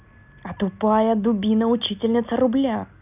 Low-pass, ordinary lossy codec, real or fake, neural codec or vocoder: 3.6 kHz; none; real; none